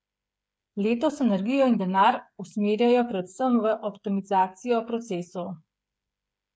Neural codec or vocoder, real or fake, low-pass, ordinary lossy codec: codec, 16 kHz, 8 kbps, FreqCodec, smaller model; fake; none; none